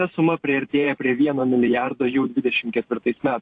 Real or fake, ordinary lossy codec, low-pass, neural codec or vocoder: fake; AAC, 48 kbps; 9.9 kHz; vocoder, 44.1 kHz, 128 mel bands every 512 samples, BigVGAN v2